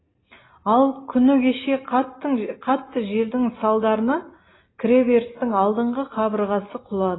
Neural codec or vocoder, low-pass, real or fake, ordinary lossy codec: none; 7.2 kHz; real; AAC, 16 kbps